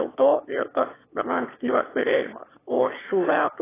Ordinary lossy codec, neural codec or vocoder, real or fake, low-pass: AAC, 16 kbps; autoencoder, 22.05 kHz, a latent of 192 numbers a frame, VITS, trained on one speaker; fake; 3.6 kHz